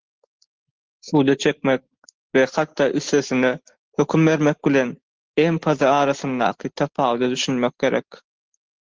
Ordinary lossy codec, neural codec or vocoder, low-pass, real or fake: Opus, 16 kbps; none; 7.2 kHz; real